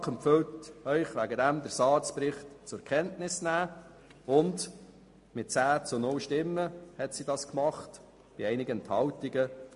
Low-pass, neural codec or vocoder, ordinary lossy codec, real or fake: 10.8 kHz; none; MP3, 48 kbps; real